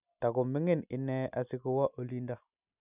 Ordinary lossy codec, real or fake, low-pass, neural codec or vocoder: none; real; 3.6 kHz; none